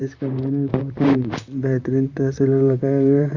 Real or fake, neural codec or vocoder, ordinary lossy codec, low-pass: fake; vocoder, 44.1 kHz, 128 mel bands, Pupu-Vocoder; Opus, 64 kbps; 7.2 kHz